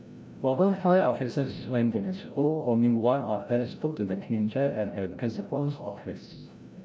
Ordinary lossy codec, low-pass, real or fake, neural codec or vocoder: none; none; fake; codec, 16 kHz, 0.5 kbps, FreqCodec, larger model